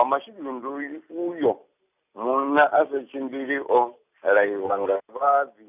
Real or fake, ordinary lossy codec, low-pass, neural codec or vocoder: real; none; 3.6 kHz; none